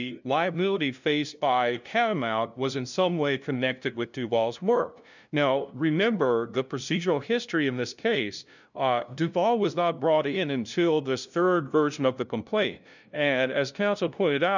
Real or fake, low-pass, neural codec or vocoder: fake; 7.2 kHz; codec, 16 kHz, 0.5 kbps, FunCodec, trained on LibriTTS, 25 frames a second